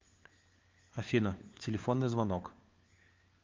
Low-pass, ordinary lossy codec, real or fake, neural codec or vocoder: 7.2 kHz; Opus, 24 kbps; fake; codec, 16 kHz, 4 kbps, FunCodec, trained on LibriTTS, 50 frames a second